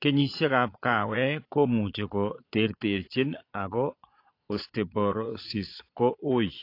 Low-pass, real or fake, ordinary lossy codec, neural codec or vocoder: 5.4 kHz; fake; AAC, 32 kbps; vocoder, 22.05 kHz, 80 mel bands, Vocos